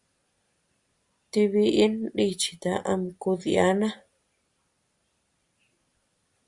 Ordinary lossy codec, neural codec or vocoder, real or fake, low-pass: Opus, 64 kbps; none; real; 10.8 kHz